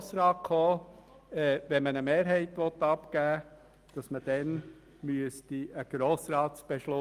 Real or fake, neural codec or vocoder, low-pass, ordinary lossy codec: real; none; 14.4 kHz; Opus, 32 kbps